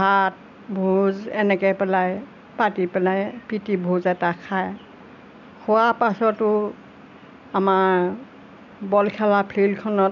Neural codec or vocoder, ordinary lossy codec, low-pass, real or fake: none; none; 7.2 kHz; real